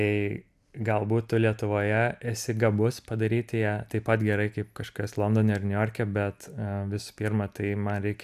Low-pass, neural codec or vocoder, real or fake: 14.4 kHz; none; real